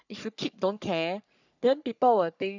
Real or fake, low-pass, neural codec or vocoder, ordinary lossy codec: fake; 7.2 kHz; codec, 44.1 kHz, 3.4 kbps, Pupu-Codec; none